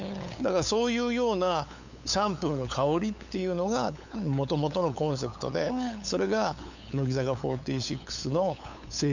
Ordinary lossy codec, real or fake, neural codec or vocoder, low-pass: none; fake; codec, 16 kHz, 8 kbps, FunCodec, trained on LibriTTS, 25 frames a second; 7.2 kHz